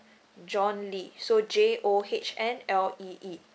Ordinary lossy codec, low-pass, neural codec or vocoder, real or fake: none; none; none; real